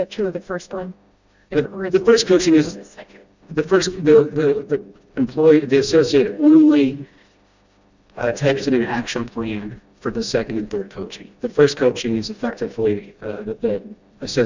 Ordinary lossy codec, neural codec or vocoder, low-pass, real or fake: Opus, 64 kbps; codec, 16 kHz, 1 kbps, FreqCodec, smaller model; 7.2 kHz; fake